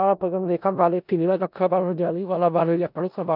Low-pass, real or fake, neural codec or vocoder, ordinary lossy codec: 5.4 kHz; fake; codec, 16 kHz in and 24 kHz out, 0.4 kbps, LongCat-Audio-Codec, four codebook decoder; none